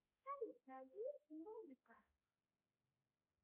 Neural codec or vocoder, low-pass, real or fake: codec, 16 kHz, 0.5 kbps, X-Codec, HuBERT features, trained on balanced general audio; 3.6 kHz; fake